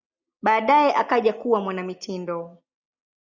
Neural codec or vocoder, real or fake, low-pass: none; real; 7.2 kHz